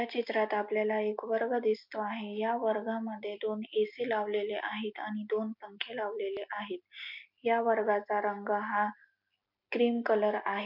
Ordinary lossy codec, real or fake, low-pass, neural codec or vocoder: MP3, 48 kbps; real; 5.4 kHz; none